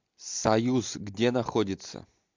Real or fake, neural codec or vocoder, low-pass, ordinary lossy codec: real; none; 7.2 kHz; MP3, 64 kbps